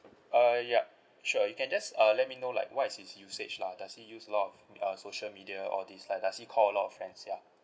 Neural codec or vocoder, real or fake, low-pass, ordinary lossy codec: none; real; none; none